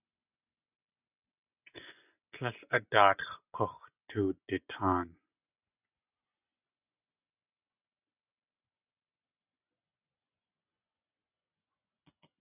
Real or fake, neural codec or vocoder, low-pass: fake; vocoder, 24 kHz, 100 mel bands, Vocos; 3.6 kHz